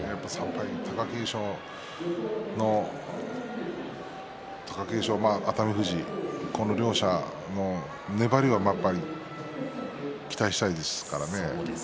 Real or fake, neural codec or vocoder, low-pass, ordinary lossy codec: real; none; none; none